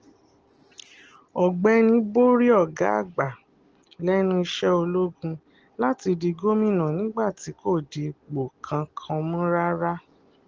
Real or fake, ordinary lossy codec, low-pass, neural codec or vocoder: real; Opus, 16 kbps; 7.2 kHz; none